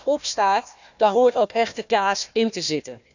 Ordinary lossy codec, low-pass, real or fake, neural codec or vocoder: none; 7.2 kHz; fake; codec, 16 kHz, 1 kbps, FunCodec, trained on Chinese and English, 50 frames a second